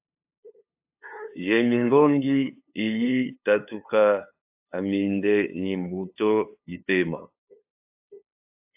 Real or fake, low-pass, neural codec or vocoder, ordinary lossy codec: fake; 3.6 kHz; codec, 16 kHz, 2 kbps, FunCodec, trained on LibriTTS, 25 frames a second; AAC, 32 kbps